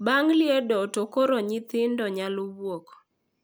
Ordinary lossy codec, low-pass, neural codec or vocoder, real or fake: none; none; none; real